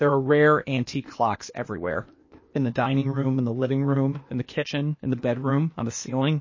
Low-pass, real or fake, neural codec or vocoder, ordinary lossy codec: 7.2 kHz; fake; codec, 16 kHz, 0.8 kbps, ZipCodec; MP3, 32 kbps